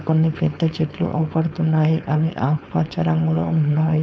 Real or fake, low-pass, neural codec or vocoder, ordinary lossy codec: fake; none; codec, 16 kHz, 4.8 kbps, FACodec; none